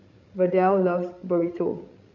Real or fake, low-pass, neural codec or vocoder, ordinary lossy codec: fake; 7.2 kHz; codec, 16 kHz, 16 kbps, FreqCodec, larger model; none